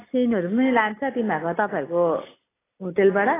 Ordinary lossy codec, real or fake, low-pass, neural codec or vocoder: AAC, 16 kbps; real; 3.6 kHz; none